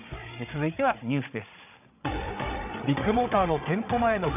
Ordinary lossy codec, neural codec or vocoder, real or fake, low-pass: none; codec, 16 kHz, 8 kbps, FreqCodec, larger model; fake; 3.6 kHz